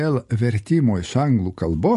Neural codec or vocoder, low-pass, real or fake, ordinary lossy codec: none; 14.4 kHz; real; MP3, 48 kbps